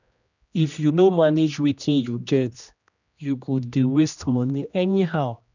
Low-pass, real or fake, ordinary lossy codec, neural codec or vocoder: 7.2 kHz; fake; none; codec, 16 kHz, 1 kbps, X-Codec, HuBERT features, trained on general audio